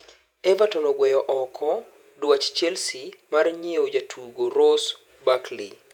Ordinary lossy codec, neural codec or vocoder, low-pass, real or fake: none; vocoder, 48 kHz, 128 mel bands, Vocos; 19.8 kHz; fake